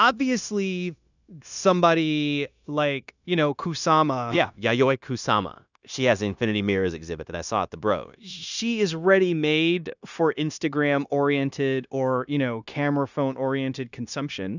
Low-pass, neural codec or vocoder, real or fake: 7.2 kHz; codec, 16 kHz, 0.9 kbps, LongCat-Audio-Codec; fake